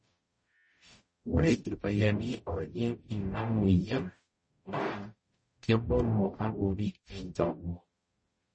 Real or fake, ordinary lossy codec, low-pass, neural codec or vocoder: fake; MP3, 32 kbps; 9.9 kHz; codec, 44.1 kHz, 0.9 kbps, DAC